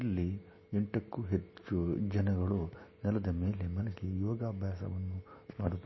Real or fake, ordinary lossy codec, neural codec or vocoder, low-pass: real; MP3, 24 kbps; none; 7.2 kHz